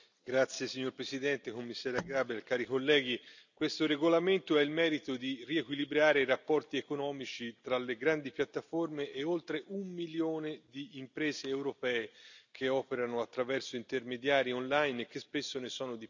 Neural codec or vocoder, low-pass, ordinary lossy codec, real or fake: none; 7.2 kHz; none; real